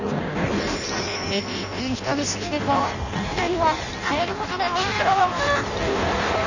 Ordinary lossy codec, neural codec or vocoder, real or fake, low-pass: none; codec, 16 kHz in and 24 kHz out, 0.6 kbps, FireRedTTS-2 codec; fake; 7.2 kHz